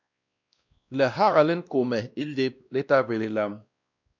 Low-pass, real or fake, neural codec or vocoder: 7.2 kHz; fake; codec, 16 kHz, 1 kbps, X-Codec, WavLM features, trained on Multilingual LibriSpeech